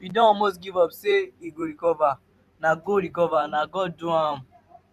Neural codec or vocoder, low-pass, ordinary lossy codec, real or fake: vocoder, 44.1 kHz, 128 mel bands every 512 samples, BigVGAN v2; 14.4 kHz; none; fake